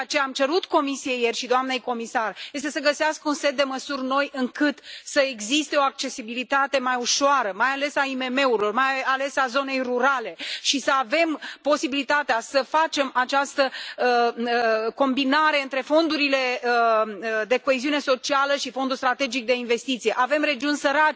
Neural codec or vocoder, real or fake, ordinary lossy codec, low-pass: none; real; none; none